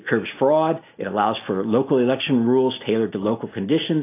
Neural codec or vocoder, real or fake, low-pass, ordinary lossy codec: none; real; 3.6 kHz; AAC, 24 kbps